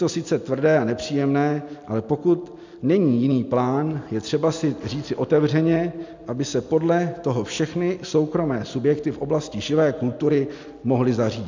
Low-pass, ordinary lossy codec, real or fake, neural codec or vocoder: 7.2 kHz; MP3, 64 kbps; real; none